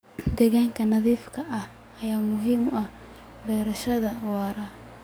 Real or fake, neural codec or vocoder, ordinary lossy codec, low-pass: fake; codec, 44.1 kHz, 7.8 kbps, DAC; none; none